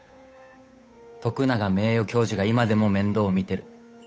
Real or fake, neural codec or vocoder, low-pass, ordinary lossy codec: fake; codec, 16 kHz, 8 kbps, FunCodec, trained on Chinese and English, 25 frames a second; none; none